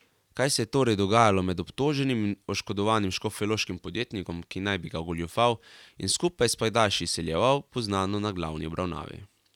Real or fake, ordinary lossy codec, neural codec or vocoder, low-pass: real; none; none; 19.8 kHz